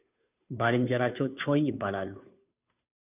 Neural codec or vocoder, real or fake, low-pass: codec, 16 kHz, 2 kbps, FunCodec, trained on Chinese and English, 25 frames a second; fake; 3.6 kHz